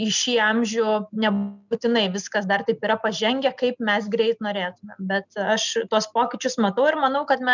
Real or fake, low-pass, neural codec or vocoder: real; 7.2 kHz; none